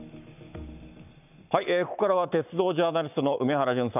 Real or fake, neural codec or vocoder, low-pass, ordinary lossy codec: fake; codec, 44.1 kHz, 7.8 kbps, Pupu-Codec; 3.6 kHz; none